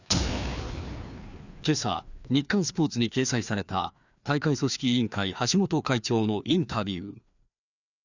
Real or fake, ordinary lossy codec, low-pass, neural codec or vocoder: fake; none; 7.2 kHz; codec, 16 kHz, 2 kbps, FreqCodec, larger model